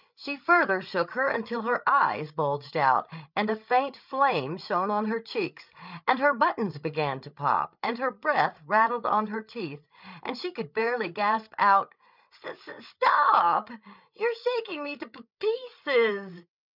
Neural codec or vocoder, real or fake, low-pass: codec, 16 kHz, 8 kbps, FreqCodec, larger model; fake; 5.4 kHz